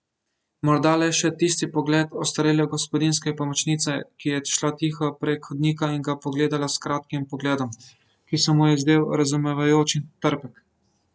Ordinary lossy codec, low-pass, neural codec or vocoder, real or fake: none; none; none; real